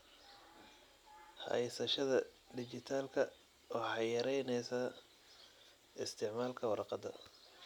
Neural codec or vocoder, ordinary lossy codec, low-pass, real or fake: none; none; 19.8 kHz; real